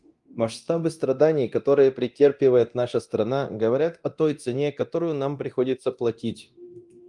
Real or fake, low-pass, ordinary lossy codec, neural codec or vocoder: fake; 10.8 kHz; Opus, 32 kbps; codec, 24 kHz, 0.9 kbps, DualCodec